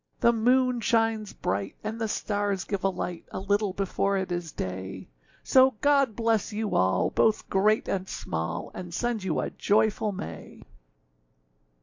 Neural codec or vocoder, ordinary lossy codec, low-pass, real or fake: none; MP3, 48 kbps; 7.2 kHz; real